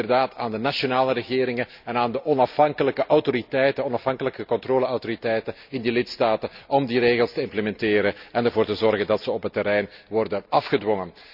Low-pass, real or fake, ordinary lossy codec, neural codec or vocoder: 5.4 kHz; real; none; none